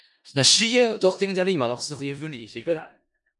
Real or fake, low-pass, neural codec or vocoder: fake; 10.8 kHz; codec, 16 kHz in and 24 kHz out, 0.4 kbps, LongCat-Audio-Codec, four codebook decoder